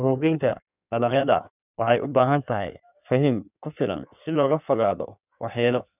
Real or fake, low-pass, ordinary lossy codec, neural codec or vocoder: fake; 3.6 kHz; none; codec, 16 kHz in and 24 kHz out, 1.1 kbps, FireRedTTS-2 codec